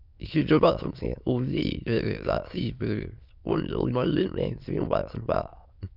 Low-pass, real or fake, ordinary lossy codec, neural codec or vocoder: 5.4 kHz; fake; none; autoencoder, 22.05 kHz, a latent of 192 numbers a frame, VITS, trained on many speakers